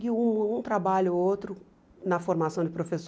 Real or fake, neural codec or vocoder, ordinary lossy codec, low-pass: real; none; none; none